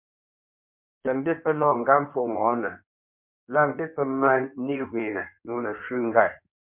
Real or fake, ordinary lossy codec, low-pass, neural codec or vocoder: fake; MP3, 32 kbps; 3.6 kHz; codec, 16 kHz in and 24 kHz out, 1.1 kbps, FireRedTTS-2 codec